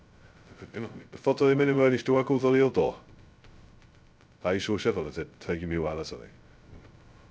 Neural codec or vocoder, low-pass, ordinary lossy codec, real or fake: codec, 16 kHz, 0.2 kbps, FocalCodec; none; none; fake